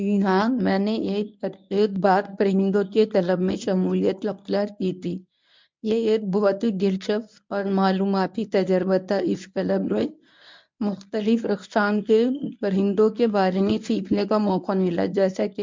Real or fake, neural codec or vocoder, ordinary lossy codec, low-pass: fake; codec, 24 kHz, 0.9 kbps, WavTokenizer, medium speech release version 1; MP3, 64 kbps; 7.2 kHz